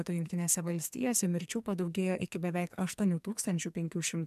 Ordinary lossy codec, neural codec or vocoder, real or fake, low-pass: MP3, 96 kbps; codec, 44.1 kHz, 2.6 kbps, SNAC; fake; 14.4 kHz